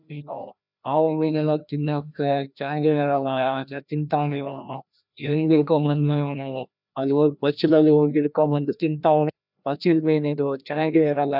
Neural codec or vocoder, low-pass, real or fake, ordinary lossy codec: codec, 16 kHz, 1 kbps, FreqCodec, larger model; 5.4 kHz; fake; none